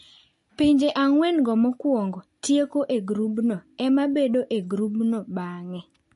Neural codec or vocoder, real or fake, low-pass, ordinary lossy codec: none; real; 10.8 kHz; MP3, 48 kbps